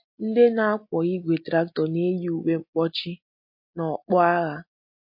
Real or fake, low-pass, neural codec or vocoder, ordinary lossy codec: real; 5.4 kHz; none; MP3, 32 kbps